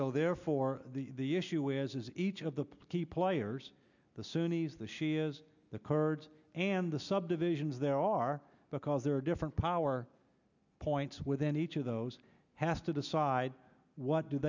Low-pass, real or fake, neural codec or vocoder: 7.2 kHz; real; none